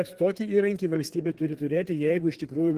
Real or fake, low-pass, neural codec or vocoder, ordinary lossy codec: fake; 14.4 kHz; codec, 32 kHz, 1.9 kbps, SNAC; Opus, 16 kbps